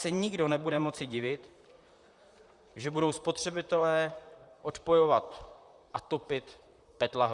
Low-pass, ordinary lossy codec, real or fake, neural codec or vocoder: 10.8 kHz; Opus, 64 kbps; fake; vocoder, 44.1 kHz, 128 mel bands, Pupu-Vocoder